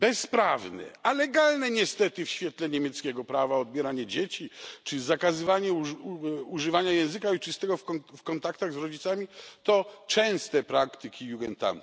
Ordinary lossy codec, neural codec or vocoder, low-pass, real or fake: none; none; none; real